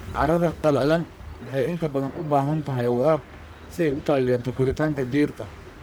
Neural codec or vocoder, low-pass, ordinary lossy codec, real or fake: codec, 44.1 kHz, 1.7 kbps, Pupu-Codec; none; none; fake